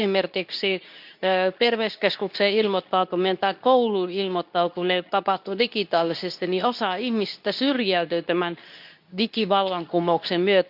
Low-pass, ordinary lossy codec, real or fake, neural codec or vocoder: 5.4 kHz; none; fake; codec, 24 kHz, 0.9 kbps, WavTokenizer, medium speech release version 2